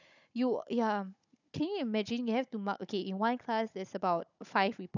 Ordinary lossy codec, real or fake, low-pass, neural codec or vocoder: none; fake; 7.2 kHz; codec, 16 kHz, 16 kbps, FunCodec, trained on Chinese and English, 50 frames a second